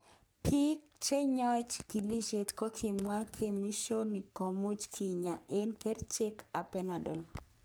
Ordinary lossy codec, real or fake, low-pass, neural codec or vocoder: none; fake; none; codec, 44.1 kHz, 3.4 kbps, Pupu-Codec